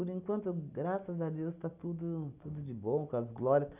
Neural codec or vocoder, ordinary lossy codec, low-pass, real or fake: none; none; 3.6 kHz; real